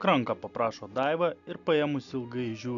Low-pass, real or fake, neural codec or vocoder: 7.2 kHz; real; none